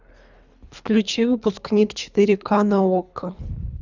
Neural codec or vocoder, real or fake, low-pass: codec, 24 kHz, 3 kbps, HILCodec; fake; 7.2 kHz